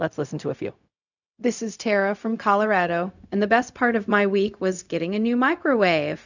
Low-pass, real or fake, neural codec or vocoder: 7.2 kHz; fake; codec, 16 kHz, 0.4 kbps, LongCat-Audio-Codec